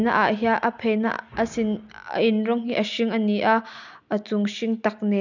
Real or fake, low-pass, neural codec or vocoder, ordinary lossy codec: real; 7.2 kHz; none; none